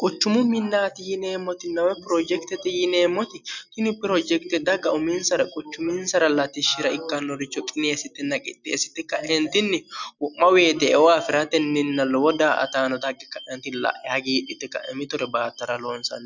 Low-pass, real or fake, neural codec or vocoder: 7.2 kHz; real; none